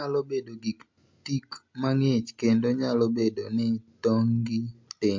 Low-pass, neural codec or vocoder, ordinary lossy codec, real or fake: 7.2 kHz; none; MP3, 48 kbps; real